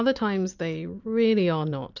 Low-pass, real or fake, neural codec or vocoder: 7.2 kHz; real; none